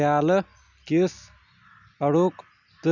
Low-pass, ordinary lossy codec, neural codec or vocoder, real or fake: 7.2 kHz; none; none; real